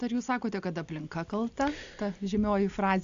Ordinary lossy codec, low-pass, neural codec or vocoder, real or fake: AAC, 64 kbps; 7.2 kHz; none; real